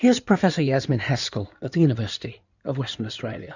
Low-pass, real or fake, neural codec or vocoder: 7.2 kHz; fake; codec, 16 kHz in and 24 kHz out, 2.2 kbps, FireRedTTS-2 codec